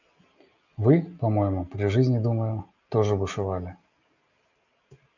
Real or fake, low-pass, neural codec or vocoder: real; 7.2 kHz; none